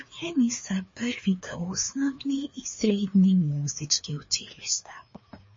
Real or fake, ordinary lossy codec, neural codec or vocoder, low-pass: fake; MP3, 32 kbps; codec, 16 kHz, 2 kbps, FreqCodec, larger model; 7.2 kHz